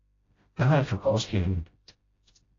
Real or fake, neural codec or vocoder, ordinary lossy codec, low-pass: fake; codec, 16 kHz, 0.5 kbps, FreqCodec, smaller model; AAC, 32 kbps; 7.2 kHz